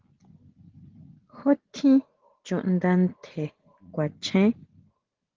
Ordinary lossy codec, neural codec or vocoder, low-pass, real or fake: Opus, 16 kbps; none; 7.2 kHz; real